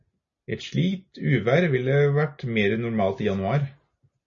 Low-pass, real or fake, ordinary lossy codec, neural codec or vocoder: 7.2 kHz; real; MP3, 32 kbps; none